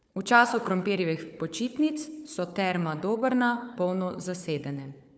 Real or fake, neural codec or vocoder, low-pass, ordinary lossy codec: fake; codec, 16 kHz, 4 kbps, FunCodec, trained on Chinese and English, 50 frames a second; none; none